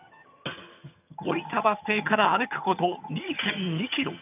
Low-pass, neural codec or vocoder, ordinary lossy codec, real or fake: 3.6 kHz; vocoder, 22.05 kHz, 80 mel bands, HiFi-GAN; none; fake